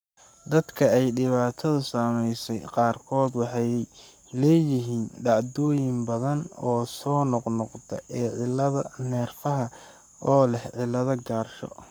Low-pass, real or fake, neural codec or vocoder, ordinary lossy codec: none; fake; codec, 44.1 kHz, 7.8 kbps, Pupu-Codec; none